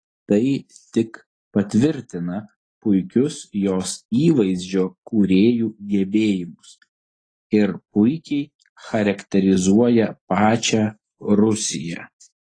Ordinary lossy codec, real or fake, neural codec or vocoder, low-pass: AAC, 32 kbps; real; none; 9.9 kHz